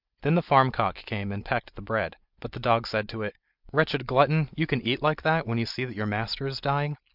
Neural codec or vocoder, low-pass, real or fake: none; 5.4 kHz; real